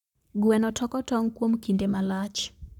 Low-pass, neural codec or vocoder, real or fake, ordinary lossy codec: 19.8 kHz; codec, 44.1 kHz, 7.8 kbps, Pupu-Codec; fake; none